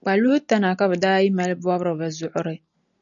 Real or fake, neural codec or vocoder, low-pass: real; none; 7.2 kHz